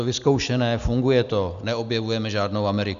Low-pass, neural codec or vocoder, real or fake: 7.2 kHz; none; real